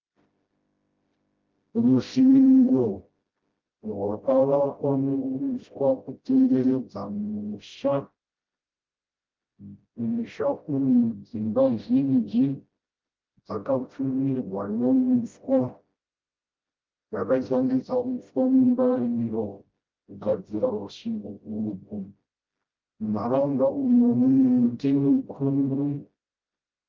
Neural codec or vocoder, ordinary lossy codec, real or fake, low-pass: codec, 16 kHz, 0.5 kbps, FreqCodec, smaller model; Opus, 32 kbps; fake; 7.2 kHz